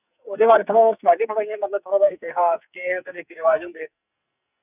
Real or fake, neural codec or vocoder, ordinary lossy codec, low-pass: fake; codec, 44.1 kHz, 2.6 kbps, SNAC; none; 3.6 kHz